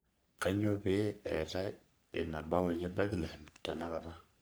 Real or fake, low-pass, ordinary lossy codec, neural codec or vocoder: fake; none; none; codec, 44.1 kHz, 3.4 kbps, Pupu-Codec